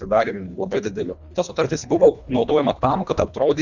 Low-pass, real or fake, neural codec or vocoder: 7.2 kHz; fake; codec, 24 kHz, 1.5 kbps, HILCodec